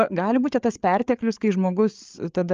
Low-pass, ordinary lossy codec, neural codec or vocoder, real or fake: 7.2 kHz; Opus, 24 kbps; codec, 16 kHz, 8 kbps, FreqCodec, larger model; fake